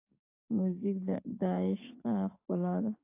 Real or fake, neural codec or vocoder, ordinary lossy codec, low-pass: fake; codec, 44.1 kHz, 7.8 kbps, DAC; AAC, 24 kbps; 3.6 kHz